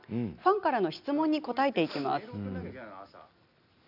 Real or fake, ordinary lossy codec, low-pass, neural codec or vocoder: real; none; 5.4 kHz; none